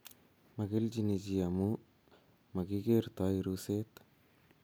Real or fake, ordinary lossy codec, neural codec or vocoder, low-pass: real; none; none; none